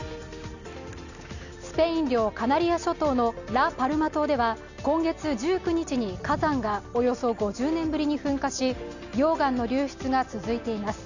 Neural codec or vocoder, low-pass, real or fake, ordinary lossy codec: none; 7.2 kHz; real; none